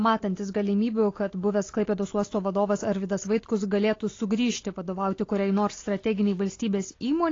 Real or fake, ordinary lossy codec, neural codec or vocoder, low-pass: real; AAC, 32 kbps; none; 7.2 kHz